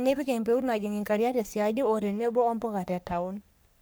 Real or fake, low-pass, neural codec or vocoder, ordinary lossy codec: fake; none; codec, 44.1 kHz, 3.4 kbps, Pupu-Codec; none